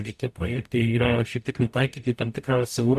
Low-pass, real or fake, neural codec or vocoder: 14.4 kHz; fake; codec, 44.1 kHz, 0.9 kbps, DAC